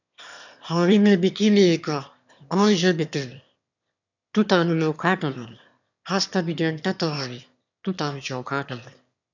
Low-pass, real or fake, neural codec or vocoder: 7.2 kHz; fake; autoencoder, 22.05 kHz, a latent of 192 numbers a frame, VITS, trained on one speaker